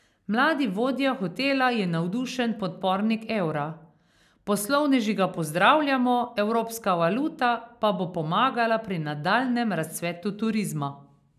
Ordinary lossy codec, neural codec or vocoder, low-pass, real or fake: none; none; 14.4 kHz; real